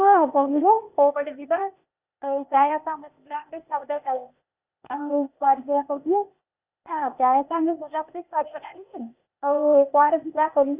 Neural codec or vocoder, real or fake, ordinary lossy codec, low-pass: codec, 16 kHz, 0.8 kbps, ZipCodec; fake; Opus, 64 kbps; 3.6 kHz